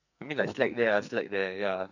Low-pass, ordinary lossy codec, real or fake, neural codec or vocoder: 7.2 kHz; none; fake; codec, 44.1 kHz, 2.6 kbps, SNAC